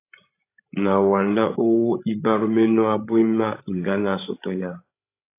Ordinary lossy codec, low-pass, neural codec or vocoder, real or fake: AAC, 24 kbps; 3.6 kHz; codec, 16 kHz, 16 kbps, FreqCodec, larger model; fake